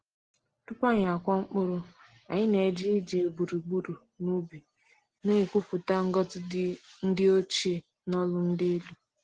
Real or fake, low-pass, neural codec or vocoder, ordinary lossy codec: real; 9.9 kHz; none; Opus, 16 kbps